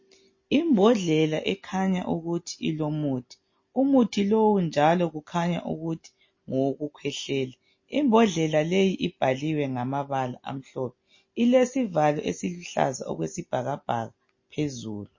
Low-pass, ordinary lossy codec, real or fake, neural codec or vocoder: 7.2 kHz; MP3, 32 kbps; real; none